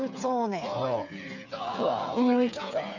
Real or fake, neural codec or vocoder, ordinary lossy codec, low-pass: fake; codec, 16 kHz, 8 kbps, FreqCodec, smaller model; none; 7.2 kHz